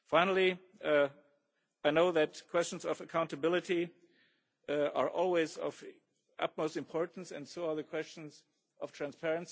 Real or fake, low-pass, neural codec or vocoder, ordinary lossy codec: real; none; none; none